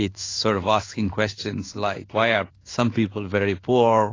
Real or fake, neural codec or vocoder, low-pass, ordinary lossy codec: fake; codec, 16 kHz in and 24 kHz out, 1 kbps, XY-Tokenizer; 7.2 kHz; AAC, 32 kbps